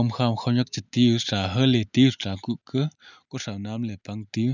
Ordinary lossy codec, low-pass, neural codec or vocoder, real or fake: none; 7.2 kHz; none; real